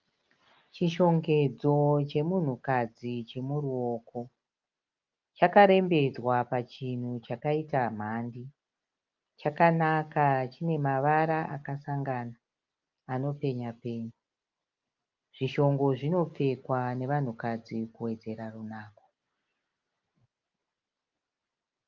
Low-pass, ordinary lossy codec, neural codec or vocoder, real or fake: 7.2 kHz; Opus, 32 kbps; none; real